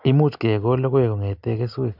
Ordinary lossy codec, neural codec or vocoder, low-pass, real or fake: AAC, 48 kbps; none; 5.4 kHz; real